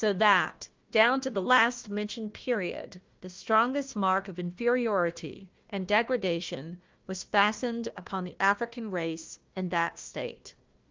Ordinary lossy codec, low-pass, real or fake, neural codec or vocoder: Opus, 32 kbps; 7.2 kHz; fake; codec, 16 kHz, 1 kbps, FunCodec, trained on Chinese and English, 50 frames a second